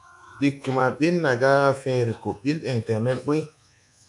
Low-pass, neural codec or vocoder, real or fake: 10.8 kHz; codec, 24 kHz, 1.2 kbps, DualCodec; fake